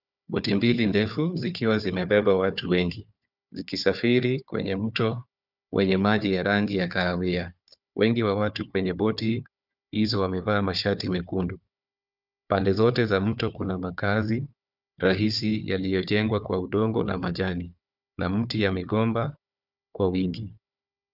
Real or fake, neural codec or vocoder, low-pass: fake; codec, 16 kHz, 4 kbps, FunCodec, trained on Chinese and English, 50 frames a second; 5.4 kHz